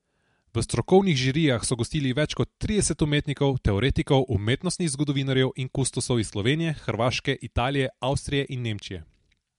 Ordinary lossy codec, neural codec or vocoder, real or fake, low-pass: MP3, 64 kbps; none; real; 10.8 kHz